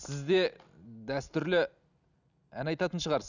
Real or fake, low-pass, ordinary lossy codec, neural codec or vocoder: real; 7.2 kHz; none; none